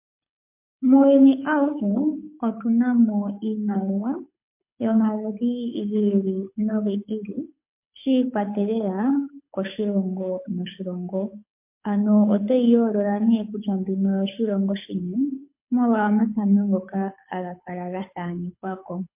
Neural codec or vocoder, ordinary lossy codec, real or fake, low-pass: codec, 24 kHz, 6 kbps, HILCodec; MP3, 24 kbps; fake; 3.6 kHz